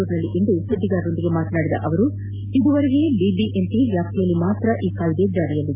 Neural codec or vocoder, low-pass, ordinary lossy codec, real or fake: none; 3.6 kHz; AAC, 32 kbps; real